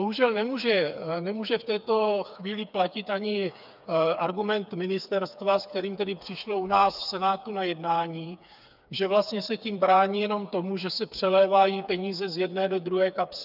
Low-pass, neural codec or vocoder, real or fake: 5.4 kHz; codec, 16 kHz, 4 kbps, FreqCodec, smaller model; fake